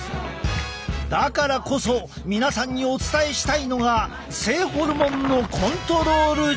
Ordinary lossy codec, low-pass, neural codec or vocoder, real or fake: none; none; none; real